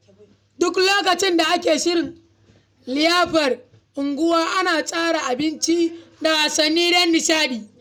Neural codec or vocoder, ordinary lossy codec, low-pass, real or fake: none; none; 19.8 kHz; real